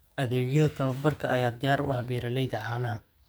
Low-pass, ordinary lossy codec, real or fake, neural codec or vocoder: none; none; fake; codec, 44.1 kHz, 2.6 kbps, SNAC